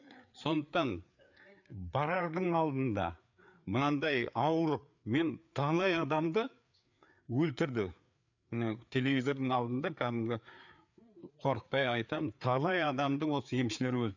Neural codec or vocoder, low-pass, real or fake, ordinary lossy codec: codec, 16 kHz, 4 kbps, FreqCodec, larger model; 7.2 kHz; fake; none